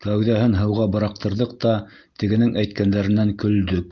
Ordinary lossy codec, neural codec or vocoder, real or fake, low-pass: Opus, 32 kbps; none; real; 7.2 kHz